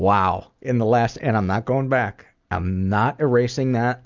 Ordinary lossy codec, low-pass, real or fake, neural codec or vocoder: Opus, 64 kbps; 7.2 kHz; real; none